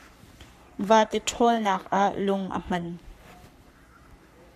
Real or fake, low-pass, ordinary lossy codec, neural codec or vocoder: fake; 14.4 kHz; AAC, 96 kbps; codec, 44.1 kHz, 3.4 kbps, Pupu-Codec